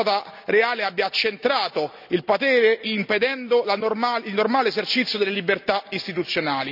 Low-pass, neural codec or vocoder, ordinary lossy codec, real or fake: 5.4 kHz; none; none; real